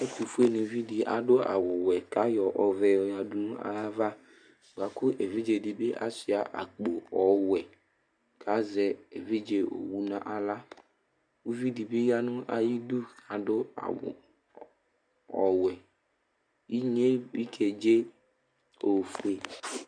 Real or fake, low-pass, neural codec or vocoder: real; 9.9 kHz; none